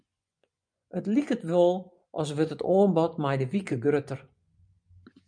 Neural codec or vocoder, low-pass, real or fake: vocoder, 24 kHz, 100 mel bands, Vocos; 9.9 kHz; fake